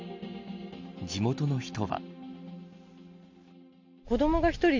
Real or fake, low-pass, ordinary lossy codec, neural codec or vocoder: real; 7.2 kHz; MP3, 64 kbps; none